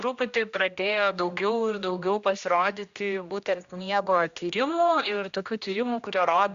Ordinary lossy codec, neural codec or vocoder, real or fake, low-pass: Opus, 64 kbps; codec, 16 kHz, 1 kbps, X-Codec, HuBERT features, trained on general audio; fake; 7.2 kHz